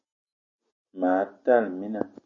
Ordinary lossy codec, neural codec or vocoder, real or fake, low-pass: MP3, 32 kbps; none; real; 7.2 kHz